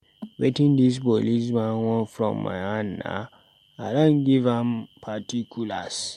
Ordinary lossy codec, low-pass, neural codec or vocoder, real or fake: MP3, 64 kbps; 19.8 kHz; none; real